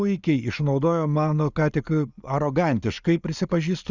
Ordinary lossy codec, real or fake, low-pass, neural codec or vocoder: Opus, 64 kbps; real; 7.2 kHz; none